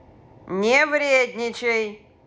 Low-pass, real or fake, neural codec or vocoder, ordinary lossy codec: none; real; none; none